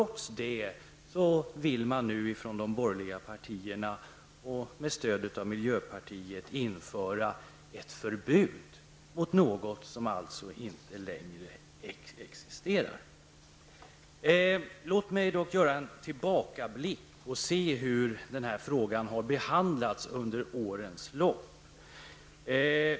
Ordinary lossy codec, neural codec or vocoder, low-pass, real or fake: none; none; none; real